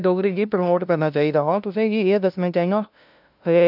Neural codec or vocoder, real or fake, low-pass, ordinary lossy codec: codec, 16 kHz, 0.5 kbps, FunCodec, trained on LibriTTS, 25 frames a second; fake; 5.4 kHz; none